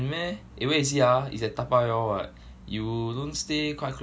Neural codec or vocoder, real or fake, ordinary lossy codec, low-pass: none; real; none; none